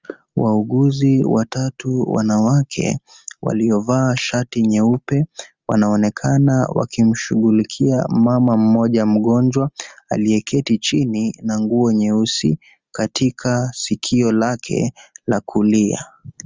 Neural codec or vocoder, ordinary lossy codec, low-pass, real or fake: none; Opus, 32 kbps; 7.2 kHz; real